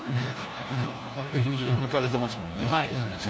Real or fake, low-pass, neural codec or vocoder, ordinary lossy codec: fake; none; codec, 16 kHz, 1 kbps, FunCodec, trained on LibriTTS, 50 frames a second; none